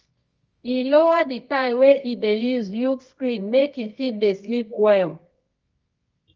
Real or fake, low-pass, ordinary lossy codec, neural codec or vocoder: fake; 7.2 kHz; Opus, 24 kbps; codec, 24 kHz, 0.9 kbps, WavTokenizer, medium music audio release